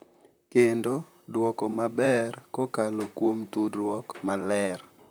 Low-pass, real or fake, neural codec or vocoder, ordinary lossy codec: none; fake; vocoder, 44.1 kHz, 128 mel bands, Pupu-Vocoder; none